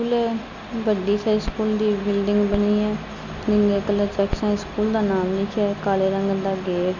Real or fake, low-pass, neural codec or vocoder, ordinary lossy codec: real; 7.2 kHz; none; none